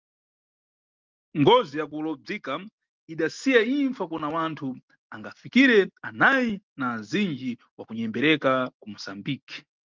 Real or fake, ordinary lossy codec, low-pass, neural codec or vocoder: real; Opus, 32 kbps; 7.2 kHz; none